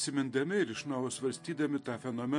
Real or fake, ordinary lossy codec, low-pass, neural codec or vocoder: real; MP3, 48 kbps; 10.8 kHz; none